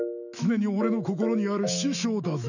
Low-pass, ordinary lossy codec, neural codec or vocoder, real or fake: 7.2 kHz; none; autoencoder, 48 kHz, 128 numbers a frame, DAC-VAE, trained on Japanese speech; fake